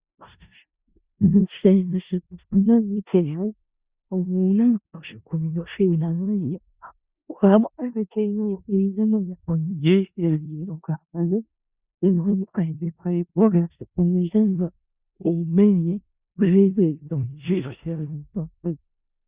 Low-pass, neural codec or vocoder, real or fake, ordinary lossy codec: 3.6 kHz; codec, 16 kHz in and 24 kHz out, 0.4 kbps, LongCat-Audio-Codec, four codebook decoder; fake; Opus, 64 kbps